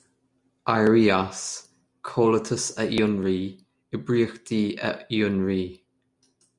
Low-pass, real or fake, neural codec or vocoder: 10.8 kHz; real; none